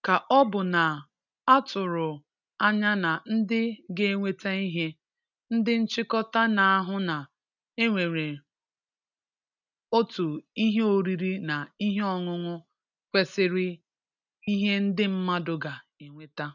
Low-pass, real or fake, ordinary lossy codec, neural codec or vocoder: 7.2 kHz; real; none; none